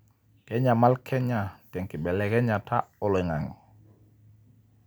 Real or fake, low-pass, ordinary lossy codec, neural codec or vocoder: real; none; none; none